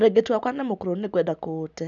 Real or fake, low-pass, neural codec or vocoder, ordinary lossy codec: real; 7.2 kHz; none; none